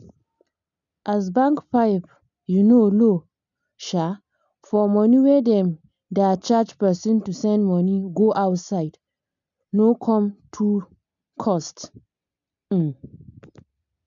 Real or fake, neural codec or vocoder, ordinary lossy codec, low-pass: real; none; none; 7.2 kHz